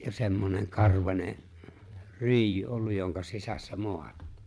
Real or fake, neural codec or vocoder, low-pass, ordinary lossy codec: fake; vocoder, 22.05 kHz, 80 mel bands, Vocos; none; none